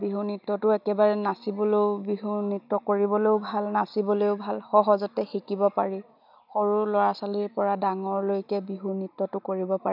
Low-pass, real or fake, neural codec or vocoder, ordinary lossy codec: 5.4 kHz; real; none; none